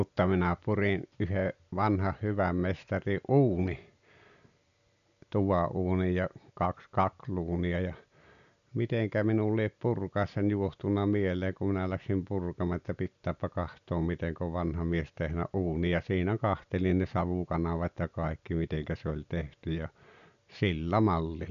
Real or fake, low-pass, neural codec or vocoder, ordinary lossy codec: real; 7.2 kHz; none; none